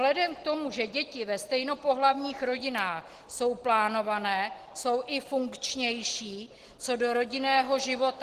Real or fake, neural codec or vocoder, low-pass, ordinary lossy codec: real; none; 14.4 kHz; Opus, 16 kbps